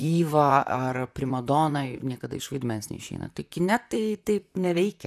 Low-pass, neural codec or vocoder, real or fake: 14.4 kHz; vocoder, 44.1 kHz, 128 mel bands, Pupu-Vocoder; fake